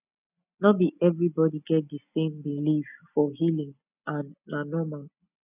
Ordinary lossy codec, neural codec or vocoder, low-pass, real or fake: none; none; 3.6 kHz; real